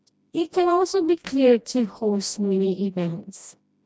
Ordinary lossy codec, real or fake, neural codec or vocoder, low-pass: none; fake; codec, 16 kHz, 1 kbps, FreqCodec, smaller model; none